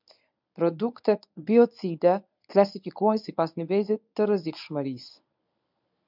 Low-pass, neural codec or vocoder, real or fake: 5.4 kHz; codec, 24 kHz, 0.9 kbps, WavTokenizer, medium speech release version 1; fake